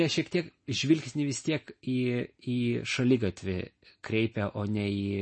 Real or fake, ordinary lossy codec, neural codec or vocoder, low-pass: real; MP3, 32 kbps; none; 9.9 kHz